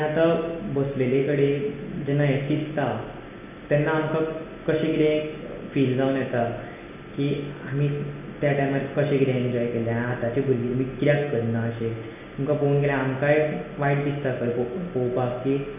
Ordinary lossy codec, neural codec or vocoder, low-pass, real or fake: none; none; 3.6 kHz; real